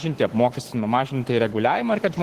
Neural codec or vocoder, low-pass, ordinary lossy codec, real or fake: codec, 44.1 kHz, 7.8 kbps, Pupu-Codec; 14.4 kHz; Opus, 16 kbps; fake